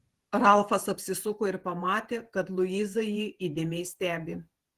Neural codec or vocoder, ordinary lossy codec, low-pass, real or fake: vocoder, 48 kHz, 128 mel bands, Vocos; Opus, 16 kbps; 14.4 kHz; fake